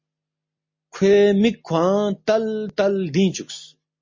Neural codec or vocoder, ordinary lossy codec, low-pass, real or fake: none; MP3, 32 kbps; 7.2 kHz; real